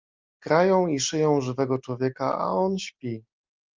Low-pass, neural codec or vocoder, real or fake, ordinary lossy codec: 7.2 kHz; none; real; Opus, 32 kbps